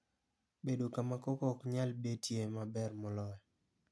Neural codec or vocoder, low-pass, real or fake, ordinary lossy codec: none; none; real; none